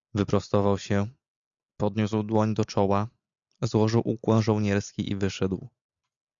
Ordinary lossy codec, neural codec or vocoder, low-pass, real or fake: MP3, 64 kbps; none; 7.2 kHz; real